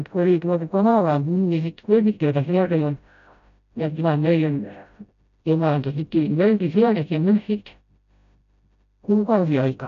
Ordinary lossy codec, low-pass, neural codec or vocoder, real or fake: none; 7.2 kHz; codec, 16 kHz, 0.5 kbps, FreqCodec, smaller model; fake